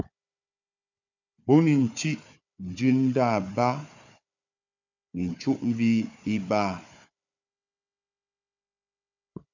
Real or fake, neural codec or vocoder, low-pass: fake; codec, 16 kHz, 4 kbps, FunCodec, trained on Chinese and English, 50 frames a second; 7.2 kHz